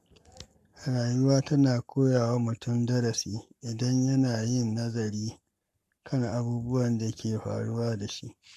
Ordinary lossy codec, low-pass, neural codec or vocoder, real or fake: none; 14.4 kHz; codec, 44.1 kHz, 7.8 kbps, Pupu-Codec; fake